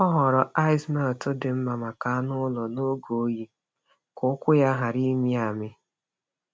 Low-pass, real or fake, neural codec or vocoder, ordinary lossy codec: none; real; none; none